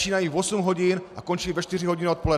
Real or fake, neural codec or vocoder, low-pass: real; none; 14.4 kHz